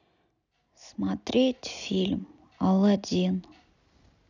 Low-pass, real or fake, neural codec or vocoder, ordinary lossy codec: 7.2 kHz; real; none; none